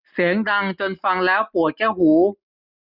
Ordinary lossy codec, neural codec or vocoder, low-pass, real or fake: none; none; 5.4 kHz; real